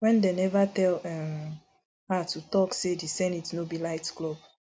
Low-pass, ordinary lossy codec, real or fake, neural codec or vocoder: none; none; real; none